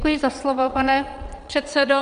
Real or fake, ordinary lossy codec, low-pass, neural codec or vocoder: fake; Opus, 64 kbps; 9.9 kHz; vocoder, 22.05 kHz, 80 mel bands, Vocos